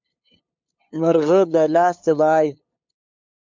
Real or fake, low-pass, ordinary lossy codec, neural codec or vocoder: fake; 7.2 kHz; MP3, 64 kbps; codec, 16 kHz, 2 kbps, FunCodec, trained on LibriTTS, 25 frames a second